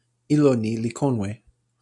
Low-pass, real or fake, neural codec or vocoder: 10.8 kHz; real; none